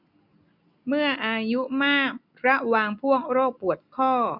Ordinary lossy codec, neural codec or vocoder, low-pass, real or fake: none; none; 5.4 kHz; real